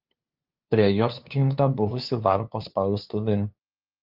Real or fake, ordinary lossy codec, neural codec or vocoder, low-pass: fake; Opus, 32 kbps; codec, 16 kHz, 2 kbps, FunCodec, trained on LibriTTS, 25 frames a second; 5.4 kHz